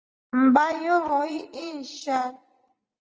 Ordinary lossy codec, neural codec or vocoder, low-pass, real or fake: Opus, 24 kbps; vocoder, 44.1 kHz, 80 mel bands, Vocos; 7.2 kHz; fake